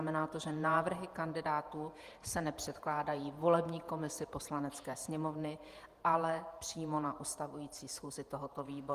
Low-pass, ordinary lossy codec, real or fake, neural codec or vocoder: 14.4 kHz; Opus, 32 kbps; fake; vocoder, 48 kHz, 128 mel bands, Vocos